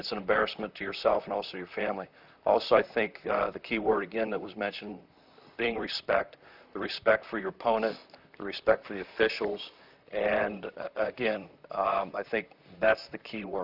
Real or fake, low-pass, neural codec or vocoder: fake; 5.4 kHz; vocoder, 44.1 kHz, 128 mel bands, Pupu-Vocoder